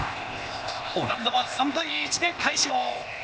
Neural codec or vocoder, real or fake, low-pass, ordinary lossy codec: codec, 16 kHz, 0.8 kbps, ZipCodec; fake; none; none